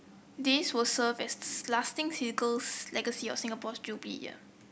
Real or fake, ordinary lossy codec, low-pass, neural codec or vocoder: real; none; none; none